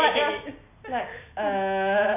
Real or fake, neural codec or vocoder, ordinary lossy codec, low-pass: real; none; none; 3.6 kHz